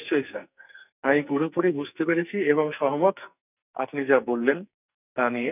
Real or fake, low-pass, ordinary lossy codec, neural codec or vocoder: fake; 3.6 kHz; none; codec, 44.1 kHz, 2.6 kbps, SNAC